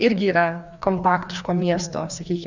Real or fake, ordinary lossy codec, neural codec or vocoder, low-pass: fake; Opus, 64 kbps; codec, 16 kHz, 2 kbps, FreqCodec, larger model; 7.2 kHz